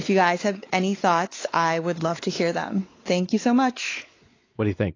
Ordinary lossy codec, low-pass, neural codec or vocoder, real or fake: AAC, 32 kbps; 7.2 kHz; codec, 16 kHz, 4 kbps, X-Codec, WavLM features, trained on Multilingual LibriSpeech; fake